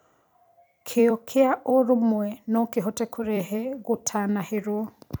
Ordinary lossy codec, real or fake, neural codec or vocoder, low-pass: none; fake; vocoder, 44.1 kHz, 128 mel bands every 256 samples, BigVGAN v2; none